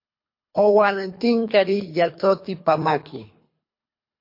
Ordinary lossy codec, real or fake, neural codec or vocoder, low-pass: MP3, 32 kbps; fake; codec, 24 kHz, 3 kbps, HILCodec; 5.4 kHz